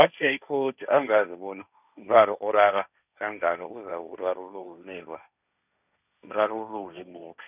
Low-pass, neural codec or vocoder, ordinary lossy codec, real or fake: 3.6 kHz; codec, 16 kHz, 1.1 kbps, Voila-Tokenizer; none; fake